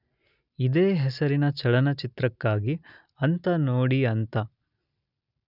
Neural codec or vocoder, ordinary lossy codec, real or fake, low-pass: none; none; real; 5.4 kHz